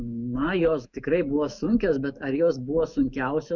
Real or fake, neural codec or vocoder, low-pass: fake; autoencoder, 48 kHz, 128 numbers a frame, DAC-VAE, trained on Japanese speech; 7.2 kHz